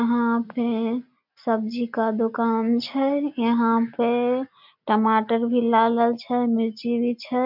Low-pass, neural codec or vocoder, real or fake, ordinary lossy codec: 5.4 kHz; none; real; MP3, 48 kbps